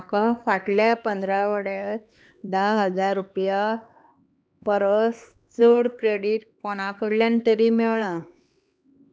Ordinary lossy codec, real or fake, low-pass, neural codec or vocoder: none; fake; none; codec, 16 kHz, 2 kbps, X-Codec, HuBERT features, trained on LibriSpeech